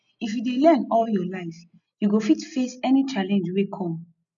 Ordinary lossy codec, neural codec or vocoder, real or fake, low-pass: none; none; real; 7.2 kHz